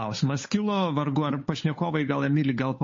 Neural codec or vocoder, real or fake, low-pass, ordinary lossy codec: codec, 16 kHz, 8 kbps, FunCodec, trained on Chinese and English, 25 frames a second; fake; 7.2 kHz; MP3, 32 kbps